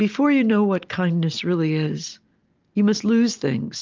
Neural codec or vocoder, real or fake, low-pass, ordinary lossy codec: none; real; 7.2 kHz; Opus, 24 kbps